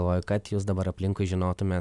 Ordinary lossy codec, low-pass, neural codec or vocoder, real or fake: Opus, 64 kbps; 10.8 kHz; none; real